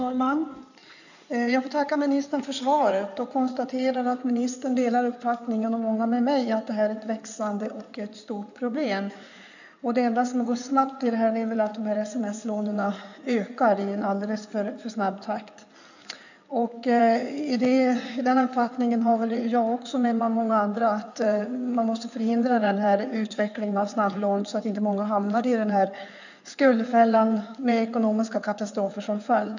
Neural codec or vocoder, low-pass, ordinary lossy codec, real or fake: codec, 16 kHz in and 24 kHz out, 2.2 kbps, FireRedTTS-2 codec; 7.2 kHz; none; fake